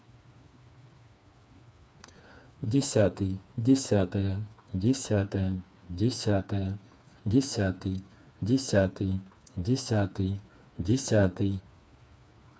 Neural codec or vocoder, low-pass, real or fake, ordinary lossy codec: codec, 16 kHz, 4 kbps, FreqCodec, smaller model; none; fake; none